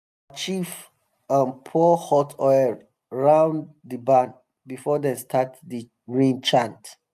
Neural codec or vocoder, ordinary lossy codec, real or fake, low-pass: none; none; real; 14.4 kHz